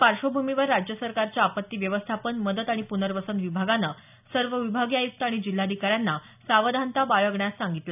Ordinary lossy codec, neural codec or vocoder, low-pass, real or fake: none; none; 3.6 kHz; real